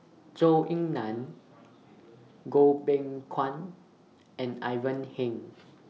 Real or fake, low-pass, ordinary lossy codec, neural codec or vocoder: real; none; none; none